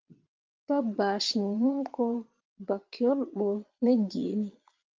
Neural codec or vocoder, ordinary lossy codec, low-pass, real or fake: vocoder, 22.05 kHz, 80 mel bands, Vocos; Opus, 32 kbps; 7.2 kHz; fake